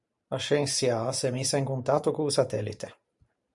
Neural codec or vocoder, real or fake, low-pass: vocoder, 44.1 kHz, 128 mel bands every 512 samples, BigVGAN v2; fake; 10.8 kHz